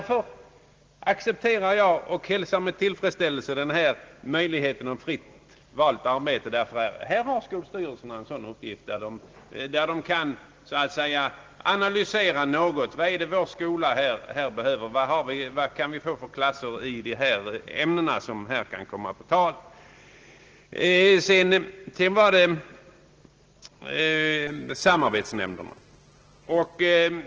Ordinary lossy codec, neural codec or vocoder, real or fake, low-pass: Opus, 16 kbps; none; real; 7.2 kHz